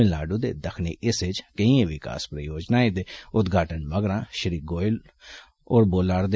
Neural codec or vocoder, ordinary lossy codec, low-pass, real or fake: none; none; none; real